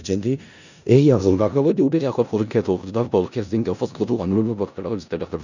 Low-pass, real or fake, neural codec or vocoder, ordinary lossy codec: 7.2 kHz; fake; codec, 16 kHz in and 24 kHz out, 0.4 kbps, LongCat-Audio-Codec, four codebook decoder; none